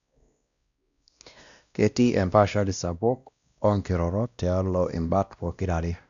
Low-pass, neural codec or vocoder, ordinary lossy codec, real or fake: 7.2 kHz; codec, 16 kHz, 1 kbps, X-Codec, WavLM features, trained on Multilingual LibriSpeech; none; fake